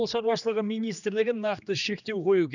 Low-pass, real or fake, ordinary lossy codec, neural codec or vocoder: 7.2 kHz; fake; none; codec, 16 kHz, 2 kbps, X-Codec, HuBERT features, trained on general audio